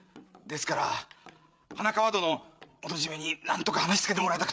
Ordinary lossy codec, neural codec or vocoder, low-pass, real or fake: none; codec, 16 kHz, 8 kbps, FreqCodec, larger model; none; fake